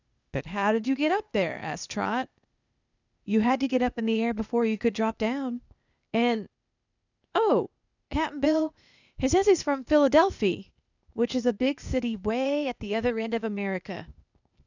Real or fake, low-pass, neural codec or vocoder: fake; 7.2 kHz; codec, 16 kHz, 0.8 kbps, ZipCodec